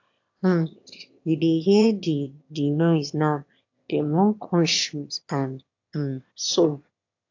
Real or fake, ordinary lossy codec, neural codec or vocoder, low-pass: fake; AAC, 48 kbps; autoencoder, 22.05 kHz, a latent of 192 numbers a frame, VITS, trained on one speaker; 7.2 kHz